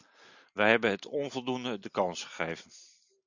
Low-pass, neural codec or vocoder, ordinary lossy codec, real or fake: 7.2 kHz; none; Opus, 64 kbps; real